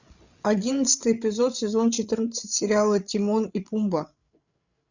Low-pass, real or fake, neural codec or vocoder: 7.2 kHz; fake; codec, 16 kHz, 8 kbps, FreqCodec, larger model